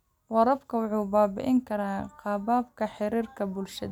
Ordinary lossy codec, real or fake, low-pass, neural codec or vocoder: none; real; 19.8 kHz; none